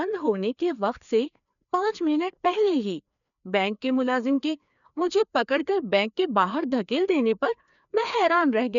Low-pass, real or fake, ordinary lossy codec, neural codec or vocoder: 7.2 kHz; fake; none; codec, 16 kHz, 2 kbps, FreqCodec, larger model